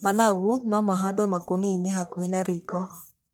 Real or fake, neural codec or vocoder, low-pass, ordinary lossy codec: fake; codec, 44.1 kHz, 1.7 kbps, Pupu-Codec; none; none